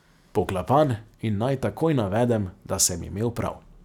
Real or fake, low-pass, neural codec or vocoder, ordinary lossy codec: real; 19.8 kHz; none; none